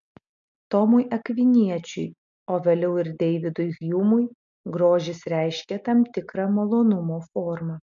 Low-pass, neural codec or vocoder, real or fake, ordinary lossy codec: 7.2 kHz; none; real; MP3, 64 kbps